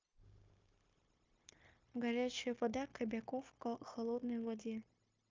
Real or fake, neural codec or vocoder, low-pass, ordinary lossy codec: fake; codec, 16 kHz, 0.9 kbps, LongCat-Audio-Codec; 7.2 kHz; Opus, 24 kbps